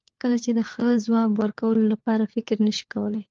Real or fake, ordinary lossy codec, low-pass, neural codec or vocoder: fake; Opus, 32 kbps; 7.2 kHz; codec, 16 kHz, 4 kbps, FunCodec, trained on LibriTTS, 50 frames a second